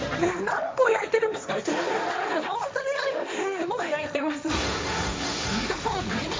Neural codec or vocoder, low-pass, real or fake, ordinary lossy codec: codec, 16 kHz, 1.1 kbps, Voila-Tokenizer; none; fake; none